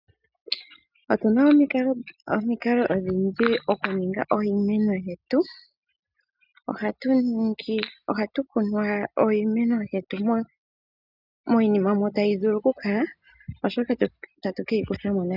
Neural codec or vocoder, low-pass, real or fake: none; 5.4 kHz; real